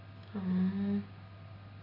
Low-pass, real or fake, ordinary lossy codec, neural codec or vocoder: 5.4 kHz; real; AAC, 24 kbps; none